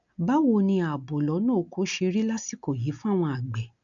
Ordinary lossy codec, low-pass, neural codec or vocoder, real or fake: none; 7.2 kHz; none; real